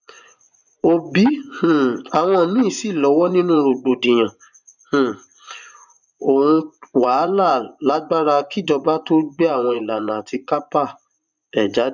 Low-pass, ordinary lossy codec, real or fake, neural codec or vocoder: 7.2 kHz; none; fake; vocoder, 24 kHz, 100 mel bands, Vocos